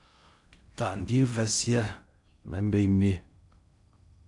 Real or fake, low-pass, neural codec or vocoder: fake; 10.8 kHz; codec, 16 kHz in and 24 kHz out, 0.6 kbps, FocalCodec, streaming, 4096 codes